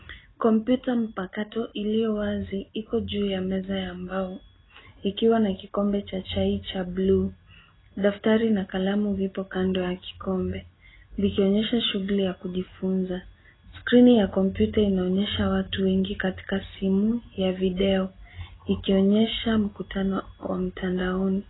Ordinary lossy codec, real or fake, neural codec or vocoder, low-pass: AAC, 16 kbps; real; none; 7.2 kHz